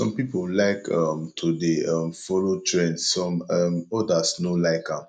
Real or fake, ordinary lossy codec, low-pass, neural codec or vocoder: real; none; 9.9 kHz; none